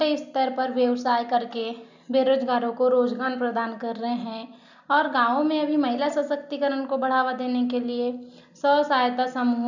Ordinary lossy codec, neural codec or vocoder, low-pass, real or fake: none; none; 7.2 kHz; real